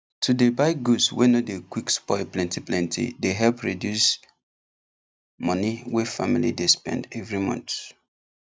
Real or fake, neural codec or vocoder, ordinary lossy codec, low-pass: real; none; none; none